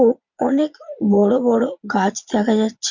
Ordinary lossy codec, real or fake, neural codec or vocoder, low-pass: Opus, 64 kbps; fake; vocoder, 22.05 kHz, 80 mel bands, Vocos; 7.2 kHz